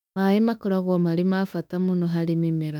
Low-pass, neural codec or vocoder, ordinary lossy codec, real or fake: 19.8 kHz; autoencoder, 48 kHz, 32 numbers a frame, DAC-VAE, trained on Japanese speech; none; fake